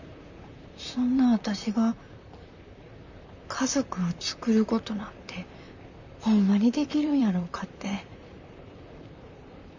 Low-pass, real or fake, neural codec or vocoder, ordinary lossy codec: 7.2 kHz; fake; vocoder, 44.1 kHz, 128 mel bands, Pupu-Vocoder; none